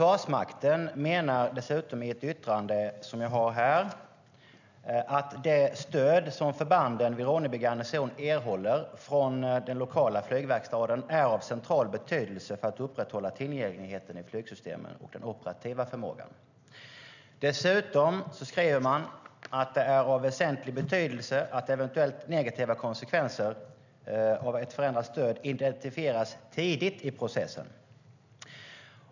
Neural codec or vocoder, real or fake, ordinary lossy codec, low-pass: none; real; none; 7.2 kHz